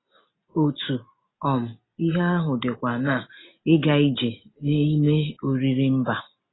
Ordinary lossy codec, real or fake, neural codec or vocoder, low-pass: AAC, 16 kbps; real; none; 7.2 kHz